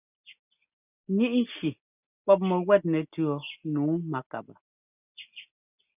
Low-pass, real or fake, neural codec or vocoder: 3.6 kHz; real; none